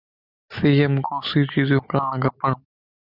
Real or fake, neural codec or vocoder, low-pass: real; none; 5.4 kHz